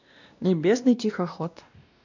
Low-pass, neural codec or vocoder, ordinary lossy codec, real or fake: 7.2 kHz; codec, 16 kHz, 1 kbps, X-Codec, WavLM features, trained on Multilingual LibriSpeech; none; fake